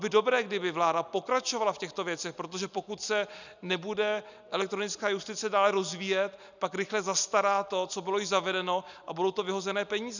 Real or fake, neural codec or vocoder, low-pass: real; none; 7.2 kHz